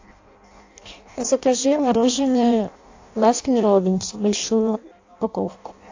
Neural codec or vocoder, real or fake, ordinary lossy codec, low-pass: codec, 16 kHz in and 24 kHz out, 0.6 kbps, FireRedTTS-2 codec; fake; AAC, 48 kbps; 7.2 kHz